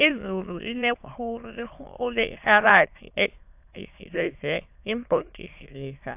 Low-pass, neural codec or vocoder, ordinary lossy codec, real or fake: 3.6 kHz; autoencoder, 22.05 kHz, a latent of 192 numbers a frame, VITS, trained on many speakers; AAC, 32 kbps; fake